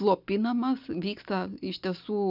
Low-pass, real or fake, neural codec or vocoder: 5.4 kHz; real; none